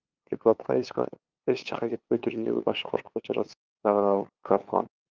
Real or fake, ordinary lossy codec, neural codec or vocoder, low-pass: fake; Opus, 32 kbps; codec, 16 kHz, 2 kbps, FunCodec, trained on LibriTTS, 25 frames a second; 7.2 kHz